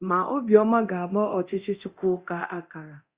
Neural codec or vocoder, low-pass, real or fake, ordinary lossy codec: codec, 24 kHz, 0.9 kbps, DualCodec; 3.6 kHz; fake; Opus, 64 kbps